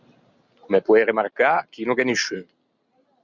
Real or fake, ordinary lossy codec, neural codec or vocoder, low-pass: real; Opus, 64 kbps; none; 7.2 kHz